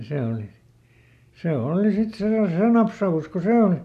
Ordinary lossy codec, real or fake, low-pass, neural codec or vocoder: none; real; 14.4 kHz; none